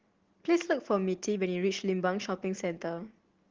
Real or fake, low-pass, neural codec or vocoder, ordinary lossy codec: real; 7.2 kHz; none; Opus, 16 kbps